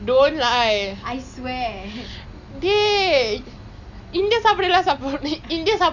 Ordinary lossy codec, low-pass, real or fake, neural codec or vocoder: none; 7.2 kHz; real; none